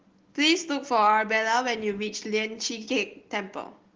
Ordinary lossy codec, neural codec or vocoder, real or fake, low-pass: Opus, 16 kbps; none; real; 7.2 kHz